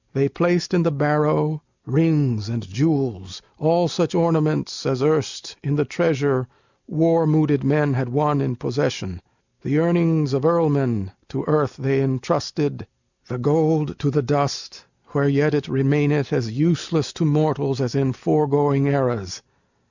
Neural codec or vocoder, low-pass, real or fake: none; 7.2 kHz; real